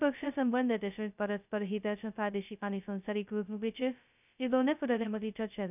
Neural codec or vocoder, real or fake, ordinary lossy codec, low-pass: codec, 16 kHz, 0.2 kbps, FocalCodec; fake; none; 3.6 kHz